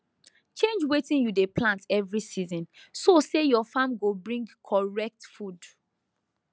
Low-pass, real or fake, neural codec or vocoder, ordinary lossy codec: none; real; none; none